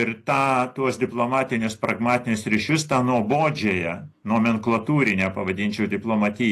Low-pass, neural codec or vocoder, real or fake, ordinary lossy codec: 14.4 kHz; none; real; AAC, 48 kbps